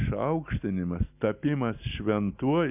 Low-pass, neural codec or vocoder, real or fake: 3.6 kHz; none; real